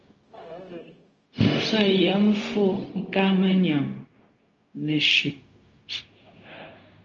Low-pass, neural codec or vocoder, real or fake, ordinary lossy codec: 7.2 kHz; codec, 16 kHz, 0.4 kbps, LongCat-Audio-Codec; fake; Opus, 24 kbps